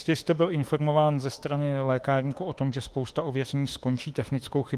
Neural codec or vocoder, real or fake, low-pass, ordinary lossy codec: autoencoder, 48 kHz, 32 numbers a frame, DAC-VAE, trained on Japanese speech; fake; 14.4 kHz; Opus, 24 kbps